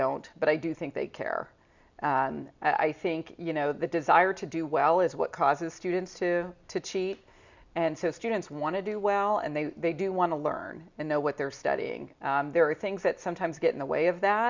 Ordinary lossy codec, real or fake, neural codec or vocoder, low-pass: Opus, 64 kbps; real; none; 7.2 kHz